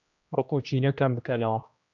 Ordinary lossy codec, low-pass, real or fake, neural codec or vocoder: AAC, 64 kbps; 7.2 kHz; fake; codec, 16 kHz, 1 kbps, X-Codec, HuBERT features, trained on general audio